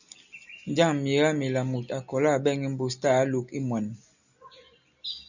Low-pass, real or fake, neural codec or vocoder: 7.2 kHz; real; none